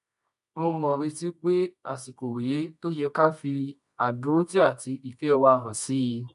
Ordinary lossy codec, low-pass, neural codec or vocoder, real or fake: none; 10.8 kHz; codec, 24 kHz, 0.9 kbps, WavTokenizer, medium music audio release; fake